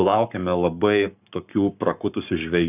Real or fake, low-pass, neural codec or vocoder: fake; 3.6 kHz; codec, 44.1 kHz, 7.8 kbps, Pupu-Codec